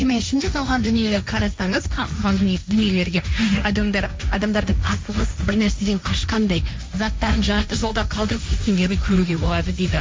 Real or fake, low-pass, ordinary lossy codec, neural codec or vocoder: fake; none; none; codec, 16 kHz, 1.1 kbps, Voila-Tokenizer